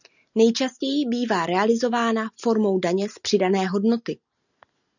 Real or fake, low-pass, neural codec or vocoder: real; 7.2 kHz; none